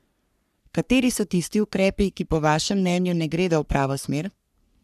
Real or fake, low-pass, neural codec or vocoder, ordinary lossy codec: fake; 14.4 kHz; codec, 44.1 kHz, 3.4 kbps, Pupu-Codec; none